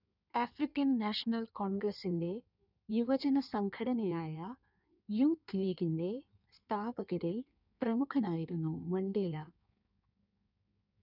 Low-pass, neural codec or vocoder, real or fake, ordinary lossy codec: 5.4 kHz; codec, 16 kHz in and 24 kHz out, 1.1 kbps, FireRedTTS-2 codec; fake; none